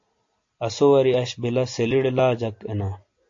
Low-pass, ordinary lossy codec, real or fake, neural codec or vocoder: 7.2 kHz; AAC, 48 kbps; real; none